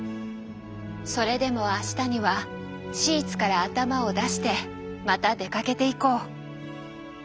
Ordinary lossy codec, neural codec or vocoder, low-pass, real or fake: none; none; none; real